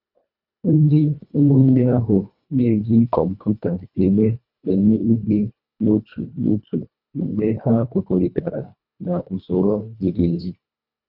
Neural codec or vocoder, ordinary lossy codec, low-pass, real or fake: codec, 24 kHz, 1.5 kbps, HILCodec; none; 5.4 kHz; fake